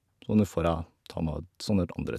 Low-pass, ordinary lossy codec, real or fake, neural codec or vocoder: 14.4 kHz; none; real; none